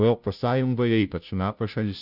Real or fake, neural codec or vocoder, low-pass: fake; codec, 16 kHz, 0.5 kbps, FunCodec, trained on Chinese and English, 25 frames a second; 5.4 kHz